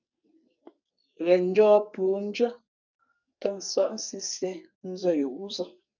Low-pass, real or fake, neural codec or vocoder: 7.2 kHz; fake; codec, 44.1 kHz, 2.6 kbps, SNAC